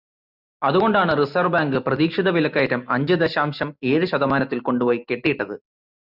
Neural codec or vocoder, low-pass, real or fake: none; 5.4 kHz; real